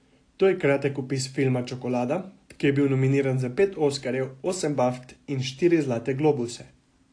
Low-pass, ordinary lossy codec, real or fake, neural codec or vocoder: 9.9 kHz; AAC, 48 kbps; real; none